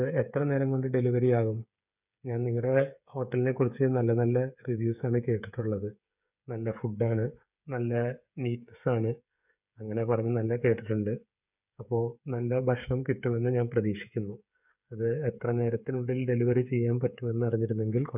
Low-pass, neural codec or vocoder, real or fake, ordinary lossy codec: 3.6 kHz; codec, 16 kHz, 8 kbps, FreqCodec, smaller model; fake; none